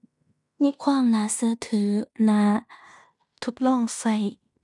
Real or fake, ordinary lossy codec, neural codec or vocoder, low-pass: fake; none; codec, 16 kHz in and 24 kHz out, 0.9 kbps, LongCat-Audio-Codec, fine tuned four codebook decoder; 10.8 kHz